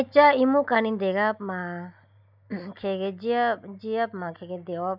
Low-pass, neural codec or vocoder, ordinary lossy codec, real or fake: 5.4 kHz; none; none; real